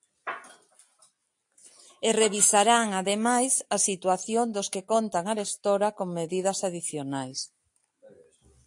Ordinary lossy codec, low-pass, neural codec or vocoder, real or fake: AAC, 64 kbps; 10.8 kHz; none; real